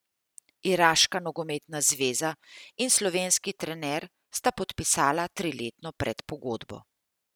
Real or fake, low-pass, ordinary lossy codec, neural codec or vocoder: real; none; none; none